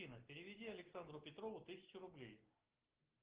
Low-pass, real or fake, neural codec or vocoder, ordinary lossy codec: 3.6 kHz; real; none; Opus, 16 kbps